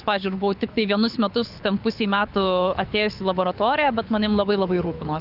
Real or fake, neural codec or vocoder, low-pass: fake; codec, 24 kHz, 6 kbps, HILCodec; 5.4 kHz